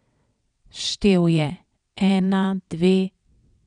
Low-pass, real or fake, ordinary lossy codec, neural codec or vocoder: 9.9 kHz; fake; none; vocoder, 22.05 kHz, 80 mel bands, WaveNeXt